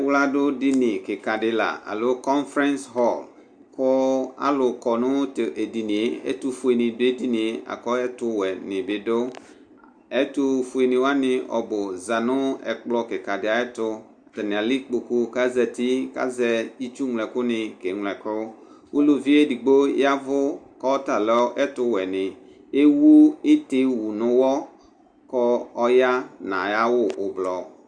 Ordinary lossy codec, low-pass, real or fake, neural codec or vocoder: Opus, 64 kbps; 9.9 kHz; real; none